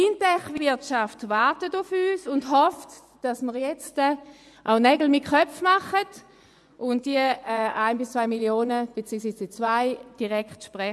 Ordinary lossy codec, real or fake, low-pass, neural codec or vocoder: none; fake; none; vocoder, 24 kHz, 100 mel bands, Vocos